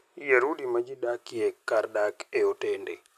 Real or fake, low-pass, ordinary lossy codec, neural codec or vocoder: real; 14.4 kHz; none; none